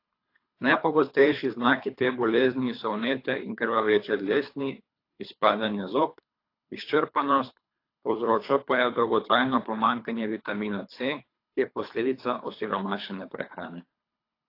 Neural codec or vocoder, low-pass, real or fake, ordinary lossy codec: codec, 24 kHz, 3 kbps, HILCodec; 5.4 kHz; fake; AAC, 32 kbps